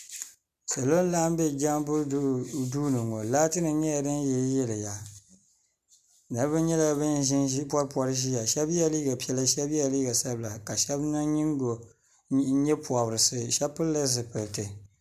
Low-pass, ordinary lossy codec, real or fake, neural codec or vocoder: 14.4 kHz; MP3, 96 kbps; real; none